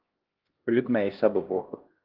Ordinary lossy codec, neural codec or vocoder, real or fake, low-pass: Opus, 16 kbps; codec, 16 kHz, 1 kbps, X-Codec, HuBERT features, trained on LibriSpeech; fake; 5.4 kHz